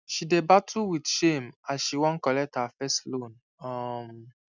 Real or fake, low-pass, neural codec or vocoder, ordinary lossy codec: real; 7.2 kHz; none; none